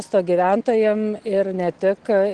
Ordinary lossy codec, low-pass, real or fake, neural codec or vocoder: Opus, 16 kbps; 9.9 kHz; real; none